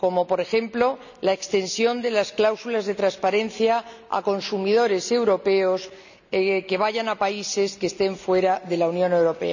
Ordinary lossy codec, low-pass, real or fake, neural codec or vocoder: none; 7.2 kHz; real; none